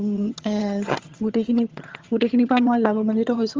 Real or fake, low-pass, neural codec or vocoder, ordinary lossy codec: fake; 7.2 kHz; vocoder, 22.05 kHz, 80 mel bands, HiFi-GAN; Opus, 32 kbps